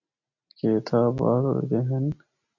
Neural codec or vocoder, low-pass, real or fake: none; 7.2 kHz; real